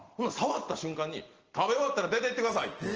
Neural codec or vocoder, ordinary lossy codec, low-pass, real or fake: none; Opus, 16 kbps; 7.2 kHz; real